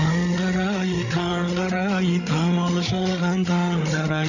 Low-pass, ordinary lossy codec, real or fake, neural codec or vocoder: 7.2 kHz; none; fake; codec, 16 kHz, 8 kbps, FreqCodec, larger model